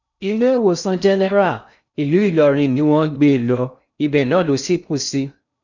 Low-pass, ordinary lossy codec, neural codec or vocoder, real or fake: 7.2 kHz; none; codec, 16 kHz in and 24 kHz out, 0.6 kbps, FocalCodec, streaming, 2048 codes; fake